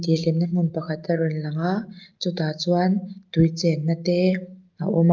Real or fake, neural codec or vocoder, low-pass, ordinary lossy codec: real; none; 7.2 kHz; Opus, 32 kbps